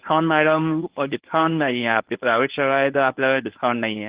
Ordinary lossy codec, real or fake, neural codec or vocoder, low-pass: Opus, 16 kbps; fake; codec, 24 kHz, 0.9 kbps, WavTokenizer, medium speech release version 1; 3.6 kHz